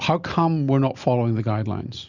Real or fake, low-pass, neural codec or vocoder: real; 7.2 kHz; none